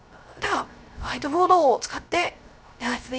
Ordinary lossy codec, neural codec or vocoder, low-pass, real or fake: none; codec, 16 kHz, 0.3 kbps, FocalCodec; none; fake